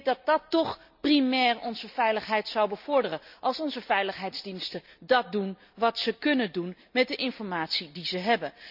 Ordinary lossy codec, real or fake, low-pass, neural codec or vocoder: none; real; 5.4 kHz; none